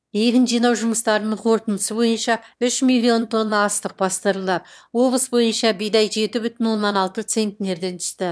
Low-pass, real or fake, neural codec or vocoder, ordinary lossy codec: none; fake; autoencoder, 22.05 kHz, a latent of 192 numbers a frame, VITS, trained on one speaker; none